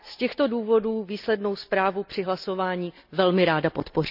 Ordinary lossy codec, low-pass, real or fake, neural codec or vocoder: none; 5.4 kHz; real; none